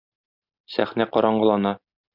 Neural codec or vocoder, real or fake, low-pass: none; real; 5.4 kHz